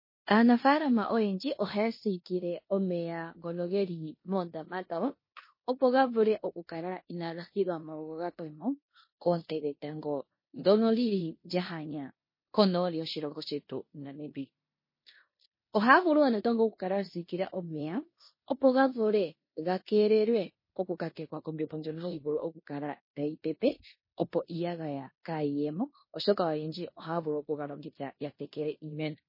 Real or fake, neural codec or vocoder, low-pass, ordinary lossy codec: fake; codec, 16 kHz in and 24 kHz out, 0.9 kbps, LongCat-Audio-Codec, four codebook decoder; 5.4 kHz; MP3, 24 kbps